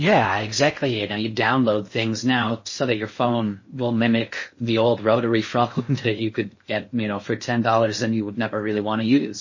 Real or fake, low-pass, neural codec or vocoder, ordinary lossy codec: fake; 7.2 kHz; codec, 16 kHz in and 24 kHz out, 0.6 kbps, FocalCodec, streaming, 4096 codes; MP3, 32 kbps